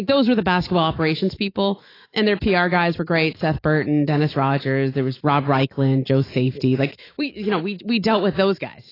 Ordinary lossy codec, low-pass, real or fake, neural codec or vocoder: AAC, 24 kbps; 5.4 kHz; fake; autoencoder, 48 kHz, 128 numbers a frame, DAC-VAE, trained on Japanese speech